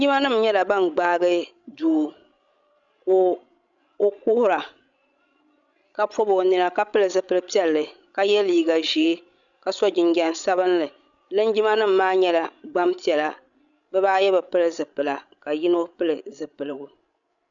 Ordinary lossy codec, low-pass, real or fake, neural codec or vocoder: MP3, 96 kbps; 7.2 kHz; fake; codec, 16 kHz, 16 kbps, FreqCodec, larger model